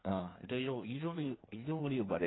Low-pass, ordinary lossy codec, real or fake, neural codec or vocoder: 7.2 kHz; AAC, 16 kbps; fake; codec, 16 kHz in and 24 kHz out, 1.1 kbps, FireRedTTS-2 codec